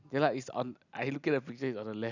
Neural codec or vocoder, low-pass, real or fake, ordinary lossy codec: none; 7.2 kHz; real; none